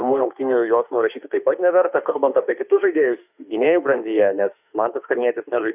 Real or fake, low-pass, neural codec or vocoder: fake; 3.6 kHz; autoencoder, 48 kHz, 32 numbers a frame, DAC-VAE, trained on Japanese speech